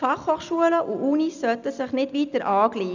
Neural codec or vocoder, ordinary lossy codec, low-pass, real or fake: none; none; 7.2 kHz; real